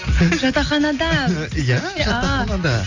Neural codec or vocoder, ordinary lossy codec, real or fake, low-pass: none; AAC, 48 kbps; real; 7.2 kHz